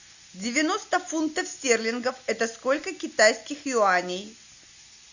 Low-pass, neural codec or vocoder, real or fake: 7.2 kHz; none; real